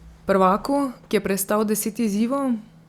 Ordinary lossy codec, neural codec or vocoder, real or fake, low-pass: Opus, 64 kbps; none; real; 19.8 kHz